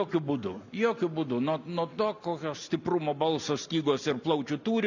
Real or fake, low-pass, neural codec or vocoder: real; 7.2 kHz; none